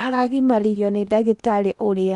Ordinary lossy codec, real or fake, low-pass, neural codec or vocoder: none; fake; 10.8 kHz; codec, 16 kHz in and 24 kHz out, 0.8 kbps, FocalCodec, streaming, 65536 codes